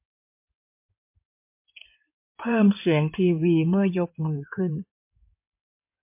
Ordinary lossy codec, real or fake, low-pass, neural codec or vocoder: MP3, 24 kbps; fake; 3.6 kHz; codec, 16 kHz in and 24 kHz out, 2.2 kbps, FireRedTTS-2 codec